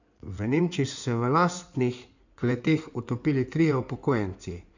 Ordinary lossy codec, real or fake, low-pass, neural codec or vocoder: none; fake; 7.2 kHz; codec, 16 kHz in and 24 kHz out, 2.2 kbps, FireRedTTS-2 codec